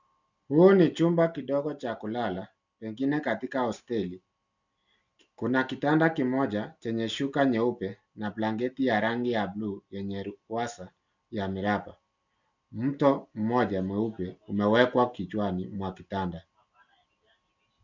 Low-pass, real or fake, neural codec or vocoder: 7.2 kHz; real; none